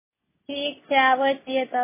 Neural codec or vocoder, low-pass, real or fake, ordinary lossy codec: none; 3.6 kHz; real; MP3, 16 kbps